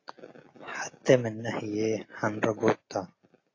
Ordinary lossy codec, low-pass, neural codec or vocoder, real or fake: AAC, 32 kbps; 7.2 kHz; none; real